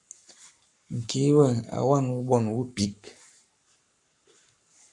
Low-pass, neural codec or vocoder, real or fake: 10.8 kHz; codec, 44.1 kHz, 7.8 kbps, Pupu-Codec; fake